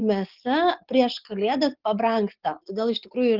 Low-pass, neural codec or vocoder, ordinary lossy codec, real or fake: 5.4 kHz; none; Opus, 32 kbps; real